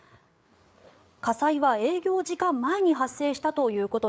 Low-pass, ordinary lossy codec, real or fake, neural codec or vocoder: none; none; fake; codec, 16 kHz, 8 kbps, FreqCodec, larger model